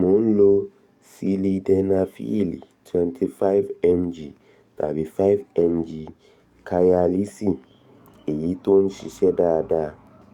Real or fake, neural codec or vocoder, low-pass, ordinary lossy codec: fake; codec, 44.1 kHz, 7.8 kbps, DAC; 19.8 kHz; Opus, 64 kbps